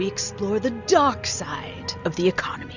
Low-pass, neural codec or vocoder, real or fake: 7.2 kHz; none; real